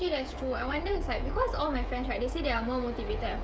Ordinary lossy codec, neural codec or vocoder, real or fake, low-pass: none; codec, 16 kHz, 16 kbps, FreqCodec, smaller model; fake; none